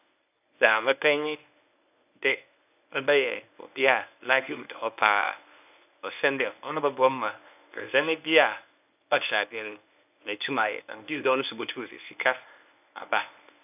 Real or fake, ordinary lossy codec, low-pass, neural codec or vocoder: fake; none; 3.6 kHz; codec, 24 kHz, 0.9 kbps, WavTokenizer, medium speech release version 1